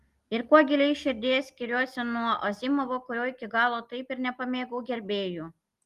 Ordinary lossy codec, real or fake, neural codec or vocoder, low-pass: Opus, 24 kbps; real; none; 14.4 kHz